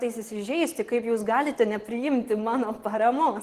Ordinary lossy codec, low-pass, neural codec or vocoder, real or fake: Opus, 16 kbps; 14.4 kHz; none; real